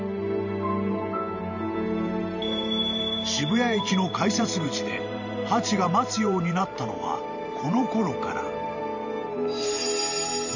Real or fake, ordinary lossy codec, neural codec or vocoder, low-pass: fake; none; vocoder, 44.1 kHz, 128 mel bands every 512 samples, BigVGAN v2; 7.2 kHz